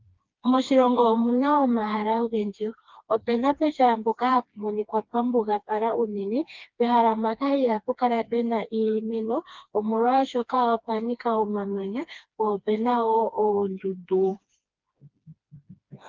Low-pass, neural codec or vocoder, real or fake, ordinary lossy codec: 7.2 kHz; codec, 16 kHz, 2 kbps, FreqCodec, smaller model; fake; Opus, 24 kbps